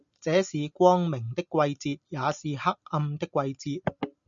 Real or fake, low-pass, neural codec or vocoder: real; 7.2 kHz; none